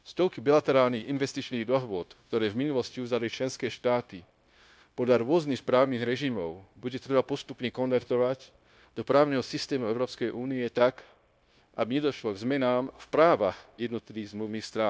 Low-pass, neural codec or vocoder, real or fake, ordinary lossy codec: none; codec, 16 kHz, 0.9 kbps, LongCat-Audio-Codec; fake; none